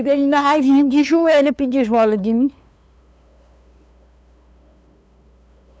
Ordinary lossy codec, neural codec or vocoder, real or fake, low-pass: none; codec, 16 kHz, 2 kbps, FunCodec, trained on LibriTTS, 25 frames a second; fake; none